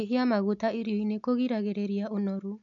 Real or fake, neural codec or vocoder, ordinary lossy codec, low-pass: real; none; none; 7.2 kHz